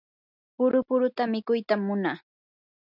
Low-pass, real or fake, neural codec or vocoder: 5.4 kHz; real; none